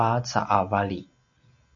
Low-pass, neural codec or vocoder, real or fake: 7.2 kHz; none; real